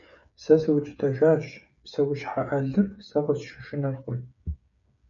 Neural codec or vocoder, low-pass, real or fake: codec, 16 kHz, 8 kbps, FreqCodec, smaller model; 7.2 kHz; fake